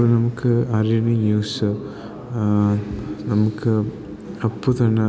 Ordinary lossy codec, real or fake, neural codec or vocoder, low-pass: none; real; none; none